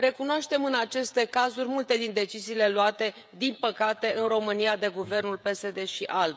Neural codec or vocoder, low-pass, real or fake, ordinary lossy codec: codec, 16 kHz, 16 kbps, FreqCodec, smaller model; none; fake; none